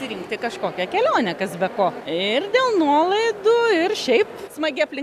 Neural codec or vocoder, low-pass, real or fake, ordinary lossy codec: none; 14.4 kHz; real; AAC, 96 kbps